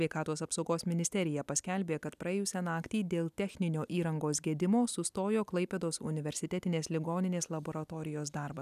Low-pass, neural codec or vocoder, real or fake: 14.4 kHz; none; real